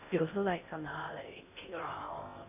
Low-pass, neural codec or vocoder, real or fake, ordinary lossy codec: 3.6 kHz; codec, 16 kHz in and 24 kHz out, 0.6 kbps, FocalCodec, streaming, 4096 codes; fake; none